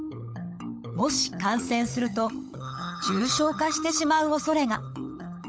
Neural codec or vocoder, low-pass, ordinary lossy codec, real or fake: codec, 16 kHz, 16 kbps, FunCodec, trained on LibriTTS, 50 frames a second; none; none; fake